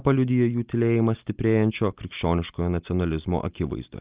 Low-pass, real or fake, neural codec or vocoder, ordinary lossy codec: 3.6 kHz; real; none; Opus, 32 kbps